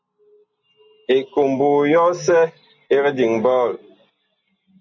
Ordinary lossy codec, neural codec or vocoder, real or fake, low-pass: MP3, 64 kbps; none; real; 7.2 kHz